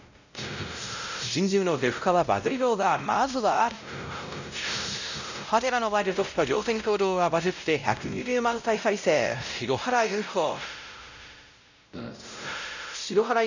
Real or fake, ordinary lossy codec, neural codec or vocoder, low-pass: fake; none; codec, 16 kHz, 0.5 kbps, X-Codec, WavLM features, trained on Multilingual LibriSpeech; 7.2 kHz